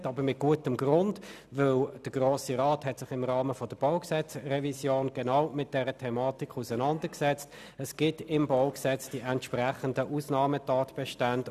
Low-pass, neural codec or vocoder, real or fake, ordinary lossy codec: 14.4 kHz; none; real; none